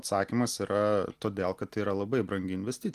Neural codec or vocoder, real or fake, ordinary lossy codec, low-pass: none; real; Opus, 24 kbps; 14.4 kHz